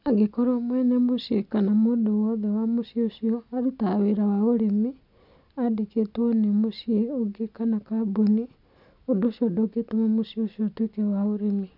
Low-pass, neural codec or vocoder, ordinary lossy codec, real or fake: 5.4 kHz; none; none; real